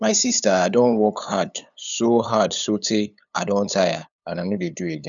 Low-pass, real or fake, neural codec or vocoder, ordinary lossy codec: 7.2 kHz; fake; codec, 16 kHz, 8 kbps, FunCodec, trained on LibriTTS, 25 frames a second; none